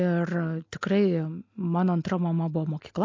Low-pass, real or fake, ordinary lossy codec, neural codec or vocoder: 7.2 kHz; real; MP3, 48 kbps; none